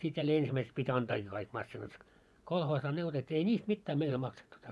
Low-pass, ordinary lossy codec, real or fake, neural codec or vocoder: none; none; fake; vocoder, 24 kHz, 100 mel bands, Vocos